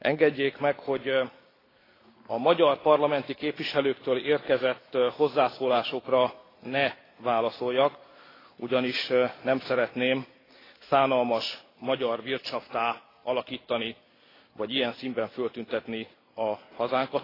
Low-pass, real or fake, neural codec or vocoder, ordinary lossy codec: 5.4 kHz; real; none; AAC, 24 kbps